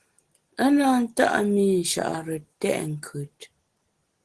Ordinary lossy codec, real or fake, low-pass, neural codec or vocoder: Opus, 16 kbps; real; 10.8 kHz; none